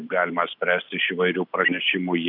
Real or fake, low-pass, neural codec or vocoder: real; 5.4 kHz; none